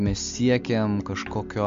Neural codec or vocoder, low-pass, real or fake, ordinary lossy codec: none; 7.2 kHz; real; MP3, 48 kbps